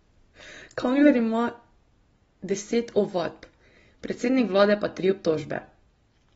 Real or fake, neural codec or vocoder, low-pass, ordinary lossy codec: real; none; 19.8 kHz; AAC, 24 kbps